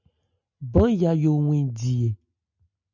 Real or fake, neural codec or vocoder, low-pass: real; none; 7.2 kHz